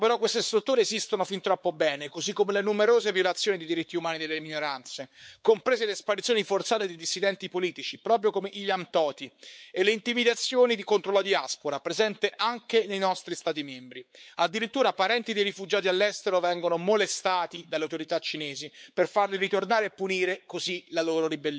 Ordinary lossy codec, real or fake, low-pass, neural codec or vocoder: none; fake; none; codec, 16 kHz, 4 kbps, X-Codec, WavLM features, trained on Multilingual LibriSpeech